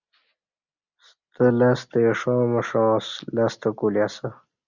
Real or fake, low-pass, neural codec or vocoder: real; 7.2 kHz; none